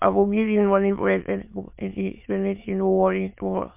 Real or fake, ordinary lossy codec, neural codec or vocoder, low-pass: fake; MP3, 32 kbps; autoencoder, 22.05 kHz, a latent of 192 numbers a frame, VITS, trained on many speakers; 3.6 kHz